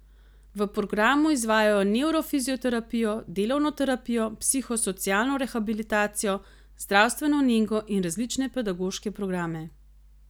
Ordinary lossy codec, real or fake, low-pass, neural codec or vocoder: none; real; none; none